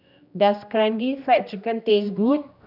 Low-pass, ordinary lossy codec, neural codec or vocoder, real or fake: 5.4 kHz; none; codec, 16 kHz, 1 kbps, X-Codec, HuBERT features, trained on general audio; fake